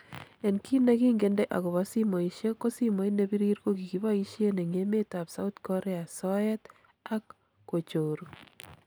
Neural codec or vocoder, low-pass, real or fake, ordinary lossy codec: none; none; real; none